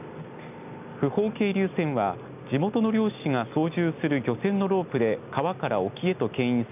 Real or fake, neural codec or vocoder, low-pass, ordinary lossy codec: real; none; 3.6 kHz; none